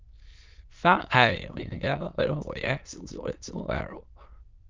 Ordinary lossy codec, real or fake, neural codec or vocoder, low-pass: Opus, 32 kbps; fake; autoencoder, 22.05 kHz, a latent of 192 numbers a frame, VITS, trained on many speakers; 7.2 kHz